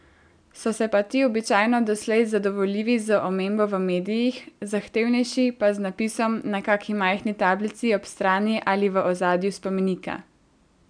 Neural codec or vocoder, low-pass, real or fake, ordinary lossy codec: none; 9.9 kHz; real; none